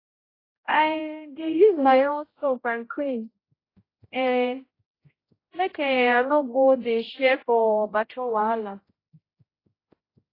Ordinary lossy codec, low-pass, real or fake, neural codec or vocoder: AAC, 24 kbps; 5.4 kHz; fake; codec, 16 kHz, 0.5 kbps, X-Codec, HuBERT features, trained on general audio